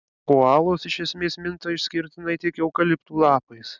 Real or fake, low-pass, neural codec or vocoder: real; 7.2 kHz; none